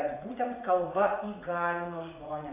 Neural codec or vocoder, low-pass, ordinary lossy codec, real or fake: vocoder, 44.1 kHz, 80 mel bands, Vocos; 3.6 kHz; AAC, 24 kbps; fake